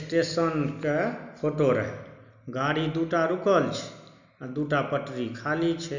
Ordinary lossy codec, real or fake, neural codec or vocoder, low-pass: none; real; none; 7.2 kHz